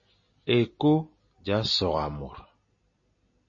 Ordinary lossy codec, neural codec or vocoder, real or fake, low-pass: MP3, 32 kbps; none; real; 7.2 kHz